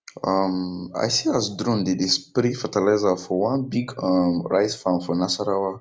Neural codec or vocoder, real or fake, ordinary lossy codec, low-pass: none; real; none; none